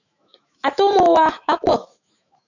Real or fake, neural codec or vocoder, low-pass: fake; autoencoder, 48 kHz, 128 numbers a frame, DAC-VAE, trained on Japanese speech; 7.2 kHz